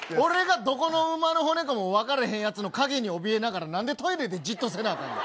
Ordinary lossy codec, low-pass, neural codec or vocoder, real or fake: none; none; none; real